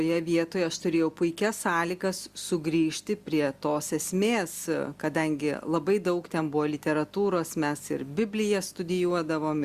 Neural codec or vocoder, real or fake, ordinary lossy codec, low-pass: none; real; Opus, 64 kbps; 14.4 kHz